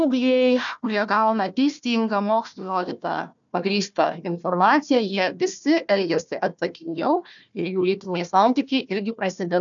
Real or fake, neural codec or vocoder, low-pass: fake; codec, 16 kHz, 1 kbps, FunCodec, trained on Chinese and English, 50 frames a second; 7.2 kHz